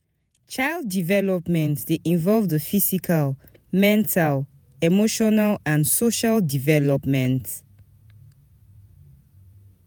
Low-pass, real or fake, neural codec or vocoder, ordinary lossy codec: none; fake; vocoder, 48 kHz, 128 mel bands, Vocos; none